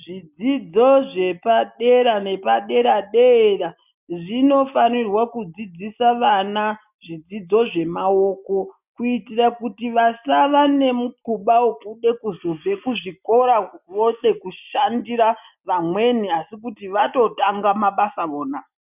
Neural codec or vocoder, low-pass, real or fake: none; 3.6 kHz; real